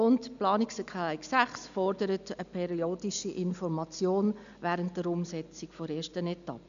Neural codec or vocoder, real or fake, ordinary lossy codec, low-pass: none; real; none; 7.2 kHz